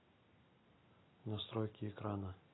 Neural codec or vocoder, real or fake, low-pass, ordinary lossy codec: none; real; 7.2 kHz; AAC, 16 kbps